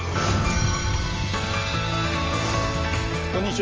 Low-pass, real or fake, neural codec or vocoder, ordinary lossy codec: 7.2 kHz; real; none; Opus, 24 kbps